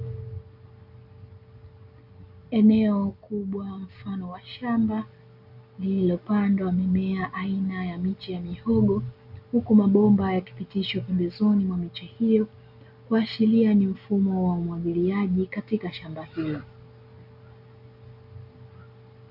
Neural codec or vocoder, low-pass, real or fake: none; 5.4 kHz; real